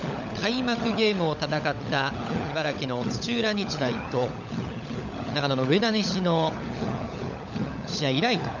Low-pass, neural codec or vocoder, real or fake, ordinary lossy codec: 7.2 kHz; codec, 16 kHz, 16 kbps, FunCodec, trained on LibriTTS, 50 frames a second; fake; none